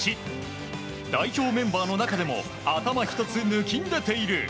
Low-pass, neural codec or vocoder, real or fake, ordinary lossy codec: none; none; real; none